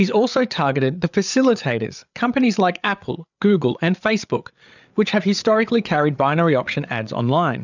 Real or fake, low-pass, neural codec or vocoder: fake; 7.2 kHz; codec, 16 kHz, 8 kbps, FreqCodec, larger model